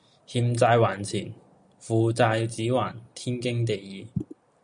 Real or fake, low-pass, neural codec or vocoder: real; 9.9 kHz; none